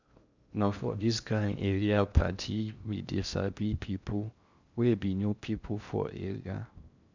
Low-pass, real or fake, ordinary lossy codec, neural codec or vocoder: 7.2 kHz; fake; none; codec, 16 kHz in and 24 kHz out, 0.6 kbps, FocalCodec, streaming, 2048 codes